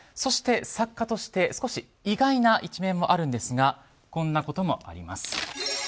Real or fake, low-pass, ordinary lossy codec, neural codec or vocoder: real; none; none; none